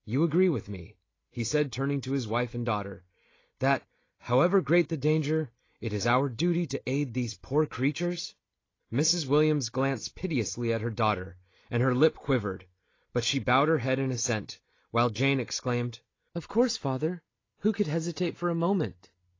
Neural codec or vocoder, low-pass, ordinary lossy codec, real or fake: none; 7.2 kHz; AAC, 32 kbps; real